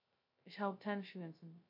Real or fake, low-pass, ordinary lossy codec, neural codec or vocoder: fake; 5.4 kHz; MP3, 32 kbps; codec, 16 kHz, 0.2 kbps, FocalCodec